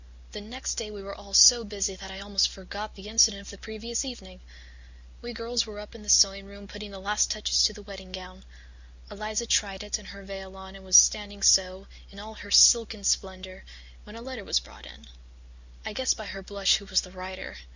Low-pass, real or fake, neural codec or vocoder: 7.2 kHz; real; none